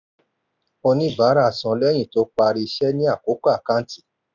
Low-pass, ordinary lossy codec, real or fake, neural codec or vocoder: 7.2 kHz; none; real; none